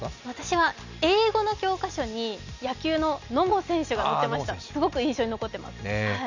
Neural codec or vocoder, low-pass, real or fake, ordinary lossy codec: none; 7.2 kHz; real; none